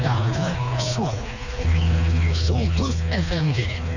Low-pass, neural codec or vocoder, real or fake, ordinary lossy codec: 7.2 kHz; codec, 16 kHz, 2 kbps, FreqCodec, smaller model; fake; none